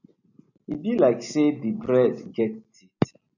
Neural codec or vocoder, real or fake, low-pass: none; real; 7.2 kHz